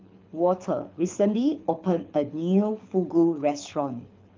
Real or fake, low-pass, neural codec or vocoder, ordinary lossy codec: fake; 7.2 kHz; codec, 24 kHz, 6 kbps, HILCodec; Opus, 24 kbps